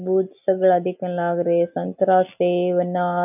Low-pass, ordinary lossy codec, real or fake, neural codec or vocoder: 3.6 kHz; none; real; none